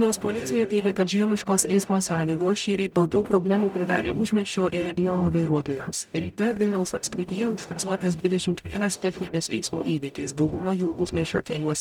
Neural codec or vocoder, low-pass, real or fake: codec, 44.1 kHz, 0.9 kbps, DAC; 19.8 kHz; fake